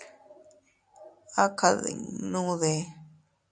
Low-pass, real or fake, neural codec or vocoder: 9.9 kHz; real; none